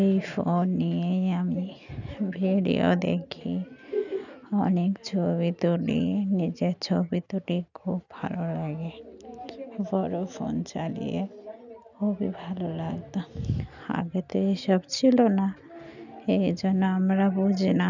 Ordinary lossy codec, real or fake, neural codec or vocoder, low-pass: none; real; none; 7.2 kHz